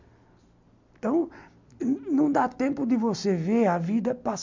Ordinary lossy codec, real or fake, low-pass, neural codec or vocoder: MP3, 64 kbps; real; 7.2 kHz; none